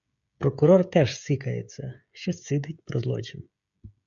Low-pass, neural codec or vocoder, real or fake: 7.2 kHz; codec, 16 kHz, 16 kbps, FreqCodec, smaller model; fake